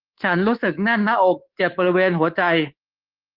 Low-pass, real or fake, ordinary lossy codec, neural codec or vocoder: 5.4 kHz; fake; Opus, 16 kbps; codec, 24 kHz, 3.1 kbps, DualCodec